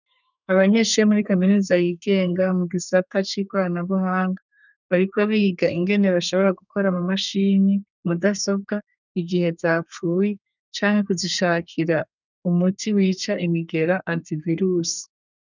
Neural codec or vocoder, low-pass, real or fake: codec, 32 kHz, 1.9 kbps, SNAC; 7.2 kHz; fake